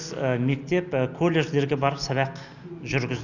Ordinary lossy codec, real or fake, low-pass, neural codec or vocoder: none; real; 7.2 kHz; none